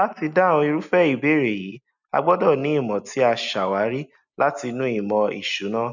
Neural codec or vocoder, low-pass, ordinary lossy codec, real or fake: none; 7.2 kHz; none; real